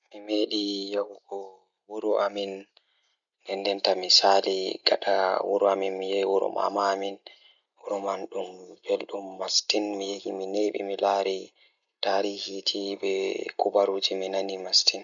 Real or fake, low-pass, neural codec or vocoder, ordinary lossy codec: real; 7.2 kHz; none; none